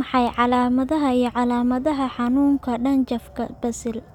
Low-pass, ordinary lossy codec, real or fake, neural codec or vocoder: 19.8 kHz; none; real; none